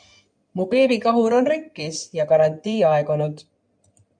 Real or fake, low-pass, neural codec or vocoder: fake; 9.9 kHz; codec, 16 kHz in and 24 kHz out, 2.2 kbps, FireRedTTS-2 codec